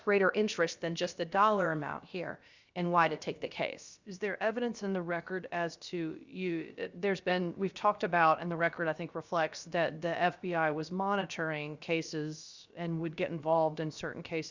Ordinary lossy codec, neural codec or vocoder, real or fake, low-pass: Opus, 64 kbps; codec, 16 kHz, about 1 kbps, DyCAST, with the encoder's durations; fake; 7.2 kHz